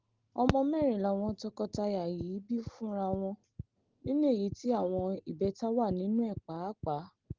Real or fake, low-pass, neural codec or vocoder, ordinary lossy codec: real; 7.2 kHz; none; Opus, 16 kbps